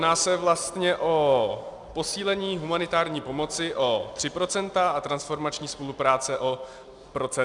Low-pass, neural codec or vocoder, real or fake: 10.8 kHz; none; real